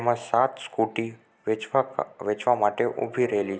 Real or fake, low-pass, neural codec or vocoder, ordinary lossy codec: real; none; none; none